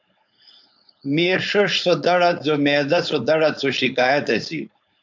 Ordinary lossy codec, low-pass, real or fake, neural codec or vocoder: MP3, 64 kbps; 7.2 kHz; fake; codec, 16 kHz, 4.8 kbps, FACodec